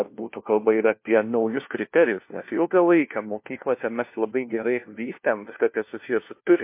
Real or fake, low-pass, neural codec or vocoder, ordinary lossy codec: fake; 3.6 kHz; codec, 16 kHz, 1 kbps, FunCodec, trained on LibriTTS, 50 frames a second; MP3, 24 kbps